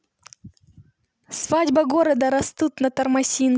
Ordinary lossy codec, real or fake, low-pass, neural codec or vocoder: none; real; none; none